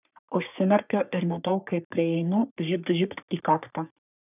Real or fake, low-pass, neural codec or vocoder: fake; 3.6 kHz; codec, 44.1 kHz, 3.4 kbps, Pupu-Codec